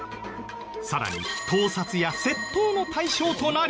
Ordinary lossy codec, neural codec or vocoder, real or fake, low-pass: none; none; real; none